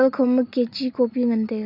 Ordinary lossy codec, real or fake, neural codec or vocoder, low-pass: none; real; none; 5.4 kHz